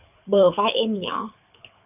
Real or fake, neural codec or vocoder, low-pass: fake; codec, 24 kHz, 6 kbps, HILCodec; 3.6 kHz